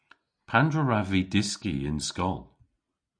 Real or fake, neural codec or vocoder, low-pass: real; none; 9.9 kHz